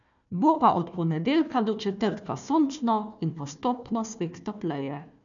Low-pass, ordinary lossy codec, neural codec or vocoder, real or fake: 7.2 kHz; none; codec, 16 kHz, 1 kbps, FunCodec, trained on Chinese and English, 50 frames a second; fake